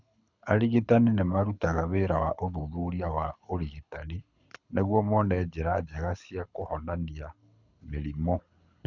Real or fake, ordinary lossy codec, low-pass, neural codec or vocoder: fake; none; 7.2 kHz; codec, 24 kHz, 6 kbps, HILCodec